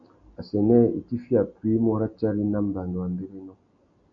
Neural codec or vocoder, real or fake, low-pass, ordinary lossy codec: none; real; 7.2 kHz; AAC, 48 kbps